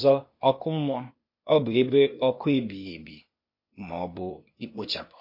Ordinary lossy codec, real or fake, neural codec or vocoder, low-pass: MP3, 32 kbps; fake; codec, 16 kHz, 0.8 kbps, ZipCodec; 5.4 kHz